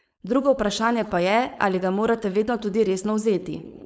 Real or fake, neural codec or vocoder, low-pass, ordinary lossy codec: fake; codec, 16 kHz, 4.8 kbps, FACodec; none; none